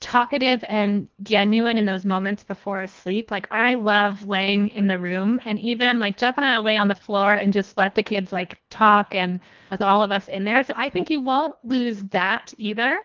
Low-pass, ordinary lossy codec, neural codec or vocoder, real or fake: 7.2 kHz; Opus, 24 kbps; codec, 24 kHz, 1.5 kbps, HILCodec; fake